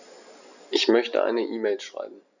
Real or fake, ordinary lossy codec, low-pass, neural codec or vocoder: real; none; 7.2 kHz; none